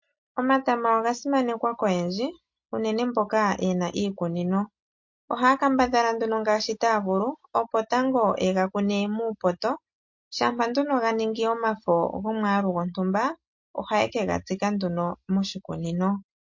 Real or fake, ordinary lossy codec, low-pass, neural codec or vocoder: real; MP3, 48 kbps; 7.2 kHz; none